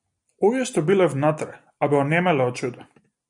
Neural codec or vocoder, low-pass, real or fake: none; 10.8 kHz; real